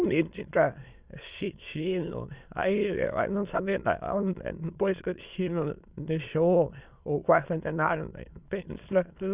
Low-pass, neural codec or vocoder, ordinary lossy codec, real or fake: 3.6 kHz; autoencoder, 22.05 kHz, a latent of 192 numbers a frame, VITS, trained on many speakers; Opus, 64 kbps; fake